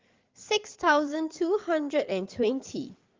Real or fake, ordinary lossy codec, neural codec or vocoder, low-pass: fake; Opus, 24 kbps; codec, 16 kHz in and 24 kHz out, 2.2 kbps, FireRedTTS-2 codec; 7.2 kHz